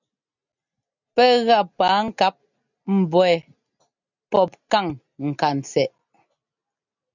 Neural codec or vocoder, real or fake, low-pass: none; real; 7.2 kHz